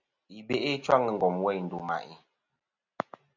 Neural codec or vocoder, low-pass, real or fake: none; 7.2 kHz; real